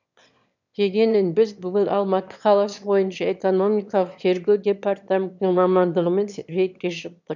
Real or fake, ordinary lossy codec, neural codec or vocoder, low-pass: fake; none; autoencoder, 22.05 kHz, a latent of 192 numbers a frame, VITS, trained on one speaker; 7.2 kHz